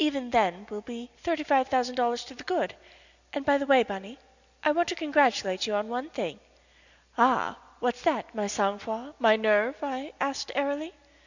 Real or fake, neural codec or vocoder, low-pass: real; none; 7.2 kHz